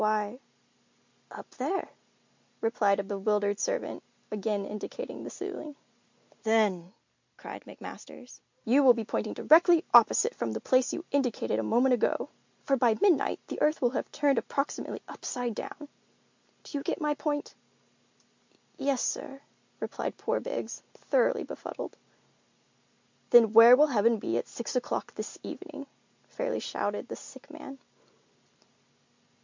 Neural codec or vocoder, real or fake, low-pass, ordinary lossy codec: none; real; 7.2 kHz; MP3, 64 kbps